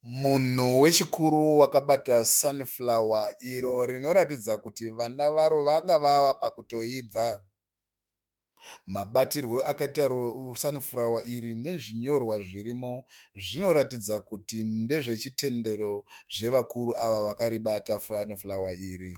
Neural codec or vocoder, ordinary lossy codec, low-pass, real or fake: autoencoder, 48 kHz, 32 numbers a frame, DAC-VAE, trained on Japanese speech; MP3, 96 kbps; 19.8 kHz; fake